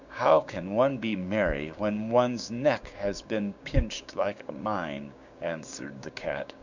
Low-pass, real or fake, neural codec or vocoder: 7.2 kHz; fake; codec, 16 kHz, 6 kbps, DAC